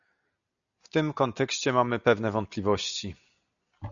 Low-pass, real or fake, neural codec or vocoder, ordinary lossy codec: 7.2 kHz; real; none; MP3, 96 kbps